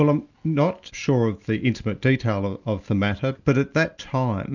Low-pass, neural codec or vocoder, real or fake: 7.2 kHz; none; real